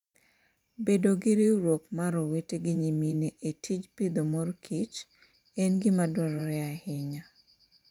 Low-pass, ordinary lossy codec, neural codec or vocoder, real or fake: 19.8 kHz; none; vocoder, 44.1 kHz, 128 mel bands every 512 samples, BigVGAN v2; fake